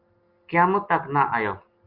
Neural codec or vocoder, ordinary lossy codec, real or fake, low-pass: none; Opus, 32 kbps; real; 5.4 kHz